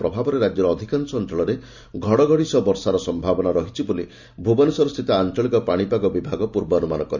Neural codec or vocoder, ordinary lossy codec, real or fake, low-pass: none; none; real; 7.2 kHz